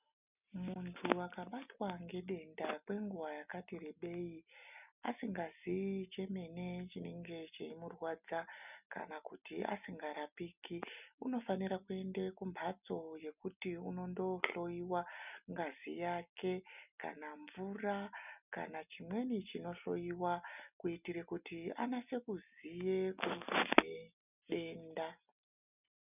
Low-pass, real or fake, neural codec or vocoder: 3.6 kHz; real; none